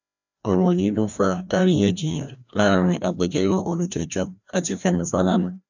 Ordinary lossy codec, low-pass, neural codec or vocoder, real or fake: none; 7.2 kHz; codec, 16 kHz, 1 kbps, FreqCodec, larger model; fake